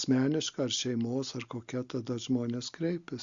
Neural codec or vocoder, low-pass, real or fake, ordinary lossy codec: none; 7.2 kHz; real; Opus, 64 kbps